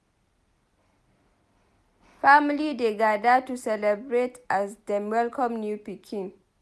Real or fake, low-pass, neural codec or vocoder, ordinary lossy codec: real; none; none; none